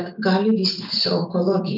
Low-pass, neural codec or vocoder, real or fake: 5.4 kHz; vocoder, 44.1 kHz, 128 mel bands every 256 samples, BigVGAN v2; fake